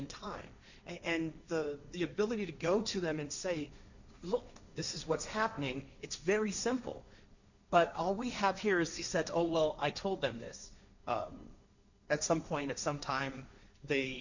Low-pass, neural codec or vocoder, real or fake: 7.2 kHz; codec, 16 kHz, 1.1 kbps, Voila-Tokenizer; fake